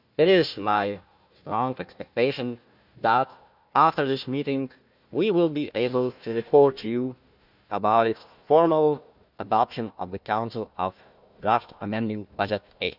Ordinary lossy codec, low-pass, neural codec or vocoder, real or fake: none; 5.4 kHz; codec, 16 kHz, 1 kbps, FunCodec, trained on Chinese and English, 50 frames a second; fake